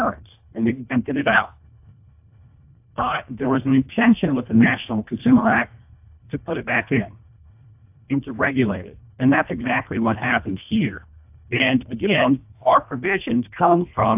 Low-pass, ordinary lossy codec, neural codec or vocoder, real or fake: 3.6 kHz; AAC, 32 kbps; codec, 24 kHz, 1.5 kbps, HILCodec; fake